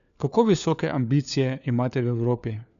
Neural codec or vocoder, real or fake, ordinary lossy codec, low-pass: codec, 16 kHz, 4 kbps, FunCodec, trained on LibriTTS, 50 frames a second; fake; none; 7.2 kHz